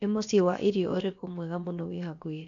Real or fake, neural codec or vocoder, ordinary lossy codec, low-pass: fake; codec, 16 kHz, about 1 kbps, DyCAST, with the encoder's durations; none; 7.2 kHz